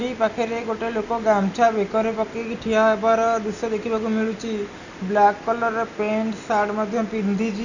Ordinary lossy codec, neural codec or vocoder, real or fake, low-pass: none; none; real; 7.2 kHz